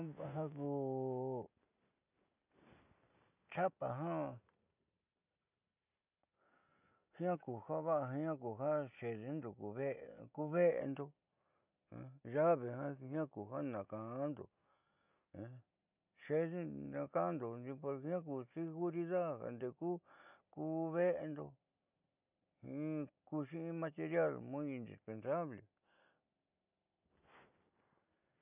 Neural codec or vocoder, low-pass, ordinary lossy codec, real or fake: none; 3.6 kHz; none; real